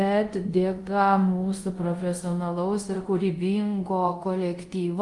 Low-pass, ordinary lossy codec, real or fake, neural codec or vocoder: 10.8 kHz; Opus, 24 kbps; fake; codec, 24 kHz, 0.5 kbps, DualCodec